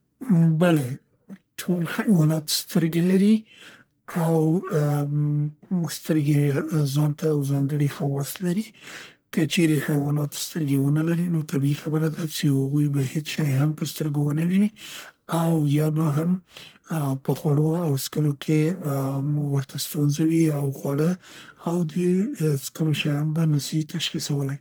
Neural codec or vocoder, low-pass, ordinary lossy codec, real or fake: codec, 44.1 kHz, 1.7 kbps, Pupu-Codec; none; none; fake